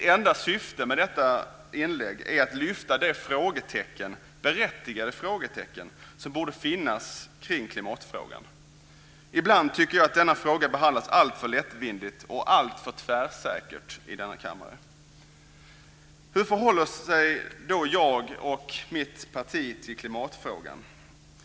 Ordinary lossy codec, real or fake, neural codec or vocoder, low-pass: none; real; none; none